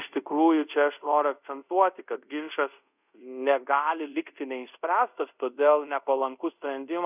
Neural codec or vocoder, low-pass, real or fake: codec, 24 kHz, 0.5 kbps, DualCodec; 3.6 kHz; fake